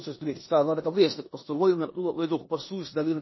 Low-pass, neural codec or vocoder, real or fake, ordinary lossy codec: 7.2 kHz; codec, 16 kHz in and 24 kHz out, 0.9 kbps, LongCat-Audio-Codec, four codebook decoder; fake; MP3, 24 kbps